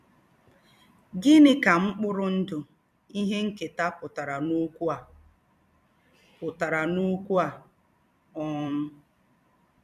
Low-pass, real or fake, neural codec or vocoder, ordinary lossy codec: 14.4 kHz; fake; vocoder, 44.1 kHz, 128 mel bands every 256 samples, BigVGAN v2; none